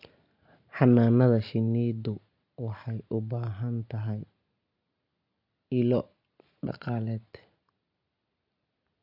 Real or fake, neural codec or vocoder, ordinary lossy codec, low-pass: real; none; none; 5.4 kHz